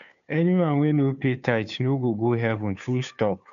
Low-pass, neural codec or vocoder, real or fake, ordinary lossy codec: 7.2 kHz; codec, 16 kHz, 2 kbps, FunCodec, trained on Chinese and English, 25 frames a second; fake; none